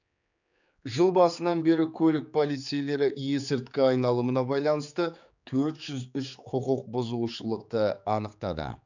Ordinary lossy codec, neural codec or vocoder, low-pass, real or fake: none; codec, 16 kHz, 4 kbps, X-Codec, HuBERT features, trained on general audio; 7.2 kHz; fake